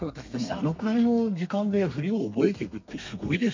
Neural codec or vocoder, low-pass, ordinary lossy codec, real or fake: codec, 32 kHz, 1.9 kbps, SNAC; 7.2 kHz; MP3, 48 kbps; fake